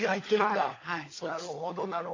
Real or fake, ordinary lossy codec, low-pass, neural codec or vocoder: fake; AAC, 32 kbps; 7.2 kHz; codec, 16 kHz, 16 kbps, FunCodec, trained on LibriTTS, 50 frames a second